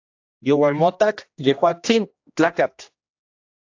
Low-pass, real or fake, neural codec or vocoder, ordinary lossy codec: 7.2 kHz; fake; codec, 16 kHz, 1 kbps, X-Codec, HuBERT features, trained on general audio; AAC, 48 kbps